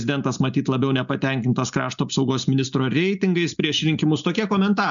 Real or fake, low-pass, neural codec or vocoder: real; 7.2 kHz; none